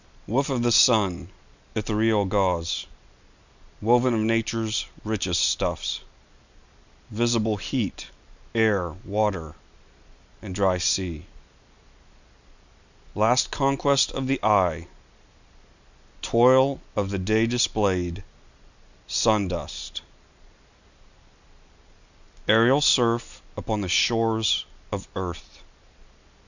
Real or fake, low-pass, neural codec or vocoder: real; 7.2 kHz; none